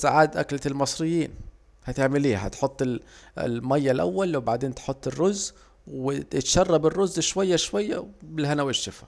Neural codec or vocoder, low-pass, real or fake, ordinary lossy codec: none; none; real; none